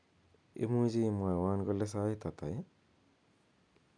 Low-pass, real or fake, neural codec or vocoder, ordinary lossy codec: 9.9 kHz; real; none; none